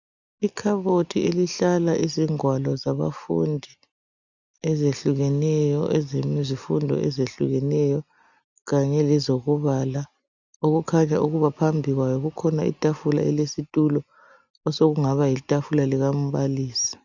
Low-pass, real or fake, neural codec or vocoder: 7.2 kHz; real; none